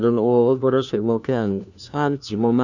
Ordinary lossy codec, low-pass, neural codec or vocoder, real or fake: none; 7.2 kHz; codec, 16 kHz, 1 kbps, FunCodec, trained on LibriTTS, 50 frames a second; fake